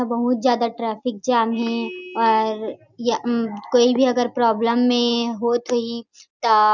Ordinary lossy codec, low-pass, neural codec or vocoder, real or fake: none; 7.2 kHz; none; real